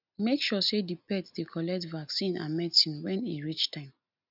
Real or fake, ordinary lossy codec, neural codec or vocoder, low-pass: real; none; none; 5.4 kHz